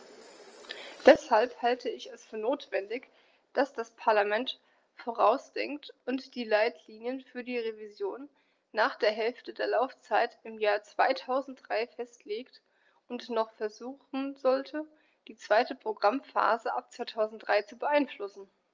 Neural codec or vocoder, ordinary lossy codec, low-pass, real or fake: none; Opus, 24 kbps; 7.2 kHz; real